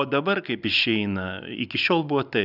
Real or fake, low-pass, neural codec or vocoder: real; 5.4 kHz; none